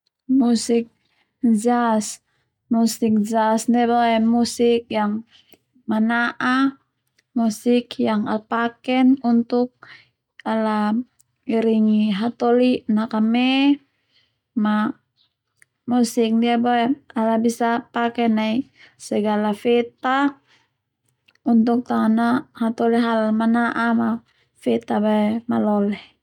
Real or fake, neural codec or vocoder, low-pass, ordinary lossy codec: real; none; 19.8 kHz; none